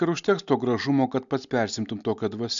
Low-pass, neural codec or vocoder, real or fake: 7.2 kHz; none; real